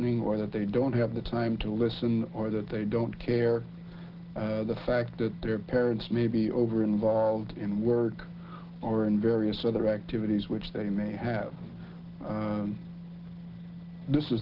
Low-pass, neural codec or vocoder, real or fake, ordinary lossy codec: 5.4 kHz; none; real; Opus, 16 kbps